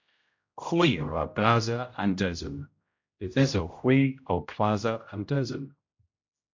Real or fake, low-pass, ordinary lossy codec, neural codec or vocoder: fake; 7.2 kHz; MP3, 48 kbps; codec, 16 kHz, 0.5 kbps, X-Codec, HuBERT features, trained on general audio